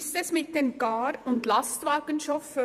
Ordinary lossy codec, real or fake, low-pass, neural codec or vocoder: Opus, 64 kbps; fake; 14.4 kHz; vocoder, 48 kHz, 128 mel bands, Vocos